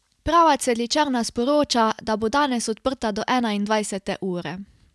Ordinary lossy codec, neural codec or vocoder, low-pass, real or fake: none; none; none; real